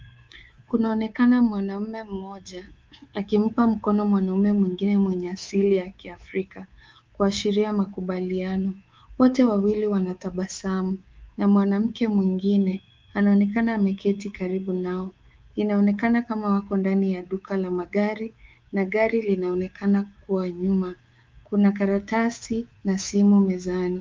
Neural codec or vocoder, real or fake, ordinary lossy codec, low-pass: codec, 24 kHz, 3.1 kbps, DualCodec; fake; Opus, 32 kbps; 7.2 kHz